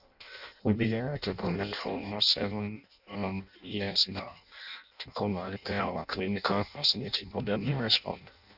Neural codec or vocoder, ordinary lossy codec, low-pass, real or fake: codec, 16 kHz in and 24 kHz out, 0.6 kbps, FireRedTTS-2 codec; none; 5.4 kHz; fake